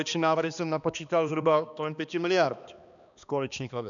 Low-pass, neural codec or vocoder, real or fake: 7.2 kHz; codec, 16 kHz, 2 kbps, X-Codec, HuBERT features, trained on balanced general audio; fake